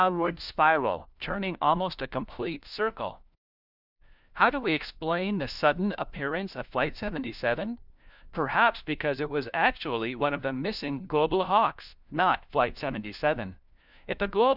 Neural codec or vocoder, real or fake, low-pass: codec, 16 kHz, 1 kbps, FunCodec, trained on LibriTTS, 50 frames a second; fake; 5.4 kHz